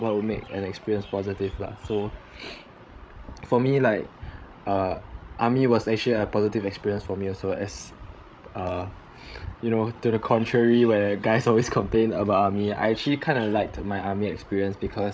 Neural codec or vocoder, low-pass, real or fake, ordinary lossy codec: codec, 16 kHz, 16 kbps, FreqCodec, larger model; none; fake; none